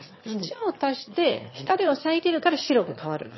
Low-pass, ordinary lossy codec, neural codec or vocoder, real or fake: 7.2 kHz; MP3, 24 kbps; autoencoder, 22.05 kHz, a latent of 192 numbers a frame, VITS, trained on one speaker; fake